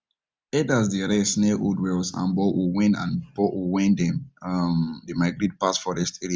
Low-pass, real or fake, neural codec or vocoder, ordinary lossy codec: none; real; none; none